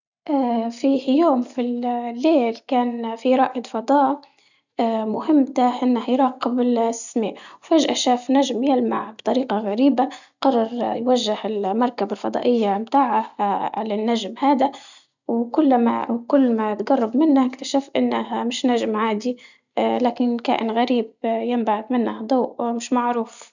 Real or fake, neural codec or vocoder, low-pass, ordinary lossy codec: real; none; 7.2 kHz; none